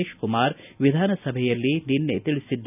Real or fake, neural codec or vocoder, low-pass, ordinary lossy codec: real; none; 3.6 kHz; none